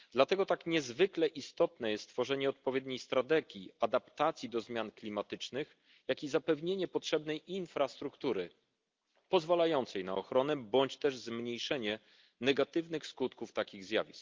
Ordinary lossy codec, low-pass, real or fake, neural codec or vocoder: Opus, 32 kbps; 7.2 kHz; real; none